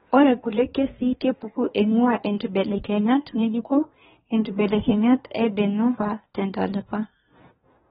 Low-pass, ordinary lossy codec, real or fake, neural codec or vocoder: 10.8 kHz; AAC, 16 kbps; fake; codec, 24 kHz, 1 kbps, SNAC